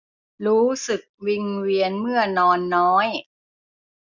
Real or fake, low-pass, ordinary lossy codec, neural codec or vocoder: real; 7.2 kHz; none; none